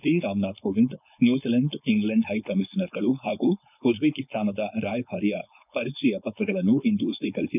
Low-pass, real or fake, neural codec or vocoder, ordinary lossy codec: 3.6 kHz; fake; codec, 16 kHz, 4.8 kbps, FACodec; none